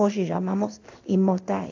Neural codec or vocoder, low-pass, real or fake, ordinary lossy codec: codec, 16 kHz in and 24 kHz out, 1 kbps, XY-Tokenizer; 7.2 kHz; fake; AAC, 48 kbps